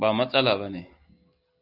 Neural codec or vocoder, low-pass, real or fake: none; 5.4 kHz; real